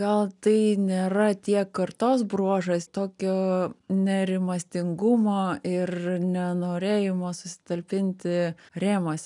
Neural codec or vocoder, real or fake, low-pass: none; real; 10.8 kHz